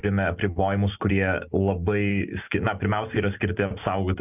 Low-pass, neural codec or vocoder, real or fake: 3.6 kHz; none; real